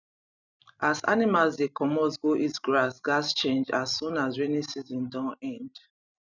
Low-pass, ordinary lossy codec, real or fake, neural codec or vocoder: 7.2 kHz; none; fake; vocoder, 44.1 kHz, 128 mel bands every 256 samples, BigVGAN v2